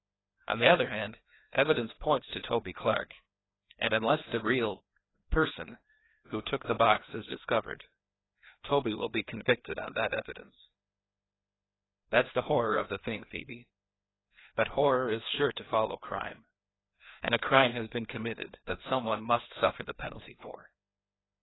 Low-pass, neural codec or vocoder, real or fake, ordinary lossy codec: 7.2 kHz; codec, 16 kHz, 2 kbps, FreqCodec, larger model; fake; AAC, 16 kbps